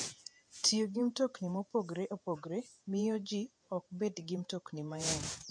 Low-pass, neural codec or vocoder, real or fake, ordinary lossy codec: 9.9 kHz; vocoder, 48 kHz, 128 mel bands, Vocos; fake; MP3, 48 kbps